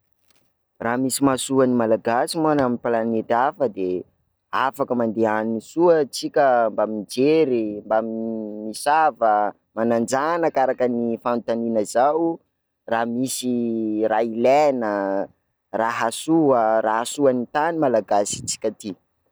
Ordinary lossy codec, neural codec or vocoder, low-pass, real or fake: none; none; none; real